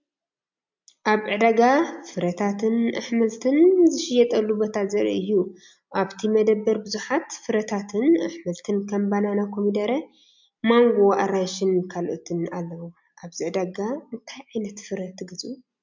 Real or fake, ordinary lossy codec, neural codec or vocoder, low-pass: real; MP3, 64 kbps; none; 7.2 kHz